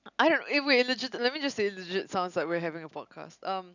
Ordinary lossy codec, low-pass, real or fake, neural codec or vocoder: none; 7.2 kHz; real; none